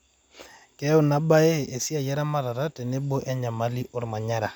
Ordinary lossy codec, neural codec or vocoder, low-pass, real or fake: none; none; 19.8 kHz; real